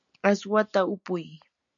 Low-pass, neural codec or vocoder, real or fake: 7.2 kHz; none; real